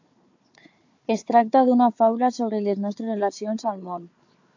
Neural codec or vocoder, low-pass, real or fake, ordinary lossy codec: codec, 16 kHz, 4 kbps, FunCodec, trained on Chinese and English, 50 frames a second; 7.2 kHz; fake; AAC, 48 kbps